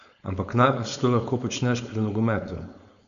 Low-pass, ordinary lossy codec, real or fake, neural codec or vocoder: 7.2 kHz; none; fake; codec, 16 kHz, 4.8 kbps, FACodec